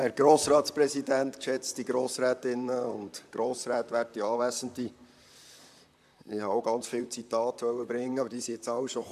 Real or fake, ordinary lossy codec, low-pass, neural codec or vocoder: fake; none; 14.4 kHz; vocoder, 44.1 kHz, 128 mel bands, Pupu-Vocoder